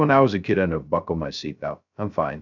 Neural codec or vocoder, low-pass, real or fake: codec, 16 kHz, 0.2 kbps, FocalCodec; 7.2 kHz; fake